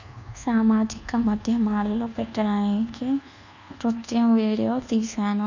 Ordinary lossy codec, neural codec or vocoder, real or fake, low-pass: none; codec, 24 kHz, 1.2 kbps, DualCodec; fake; 7.2 kHz